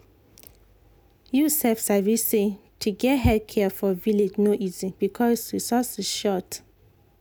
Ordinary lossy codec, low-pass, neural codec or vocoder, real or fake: none; none; none; real